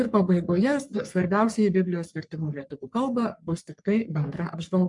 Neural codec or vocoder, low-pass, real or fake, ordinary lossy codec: codec, 44.1 kHz, 3.4 kbps, Pupu-Codec; 10.8 kHz; fake; MP3, 64 kbps